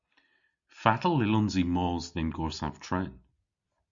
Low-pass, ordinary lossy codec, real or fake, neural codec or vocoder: 7.2 kHz; Opus, 64 kbps; real; none